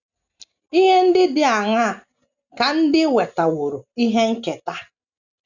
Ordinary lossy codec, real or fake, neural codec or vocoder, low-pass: none; real; none; 7.2 kHz